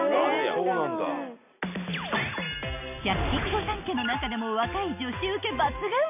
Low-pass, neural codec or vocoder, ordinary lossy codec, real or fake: 3.6 kHz; none; none; real